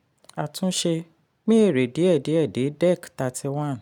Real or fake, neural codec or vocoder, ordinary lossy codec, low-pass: real; none; none; 19.8 kHz